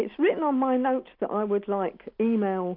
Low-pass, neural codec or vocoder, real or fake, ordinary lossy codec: 5.4 kHz; none; real; MP3, 48 kbps